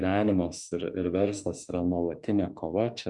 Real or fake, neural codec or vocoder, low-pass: fake; autoencoder, 48 kHz, 32 numbers a frame, DAC-VAE, trained on Japanese speech; 10.8 kHz